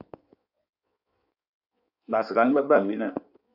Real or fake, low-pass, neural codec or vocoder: fake; 5.4 kHz; codec, 16 kHz in and 24 kHz out, 1.1 kbps, FireRedTTS-2 codec